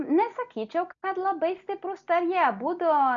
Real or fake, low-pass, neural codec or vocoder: real; 7.2 kHz; none